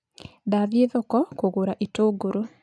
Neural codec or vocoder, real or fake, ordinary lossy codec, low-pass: none; real; none; none